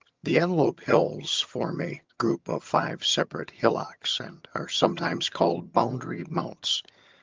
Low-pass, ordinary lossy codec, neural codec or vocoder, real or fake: 7.2 kHz; Opus, 24 kbps; vocoder, 22.05 kHz, 80 mel bands, HiFi-GAN; fake